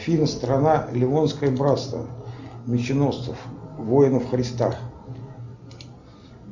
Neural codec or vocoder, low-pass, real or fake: none; 7.2 kHz; real